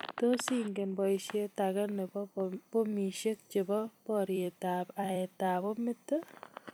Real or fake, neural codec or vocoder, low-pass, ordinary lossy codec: fake; vocoder, 44.1 kHz, 128 mel bands every 256 samples, BigVGAN v2; none; none